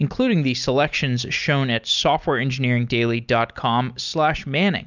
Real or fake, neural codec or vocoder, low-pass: real; none; 7.2 kHz